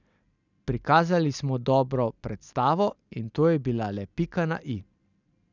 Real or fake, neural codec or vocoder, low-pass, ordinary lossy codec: real; none; 7.2 kHz; none